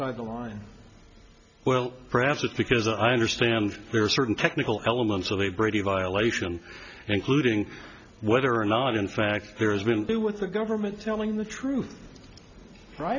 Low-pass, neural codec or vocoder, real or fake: 7.2 kHz; none; real